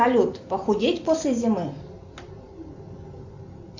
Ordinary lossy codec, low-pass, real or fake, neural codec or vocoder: AAC, 48 kbps; 7.2 kHz; real; none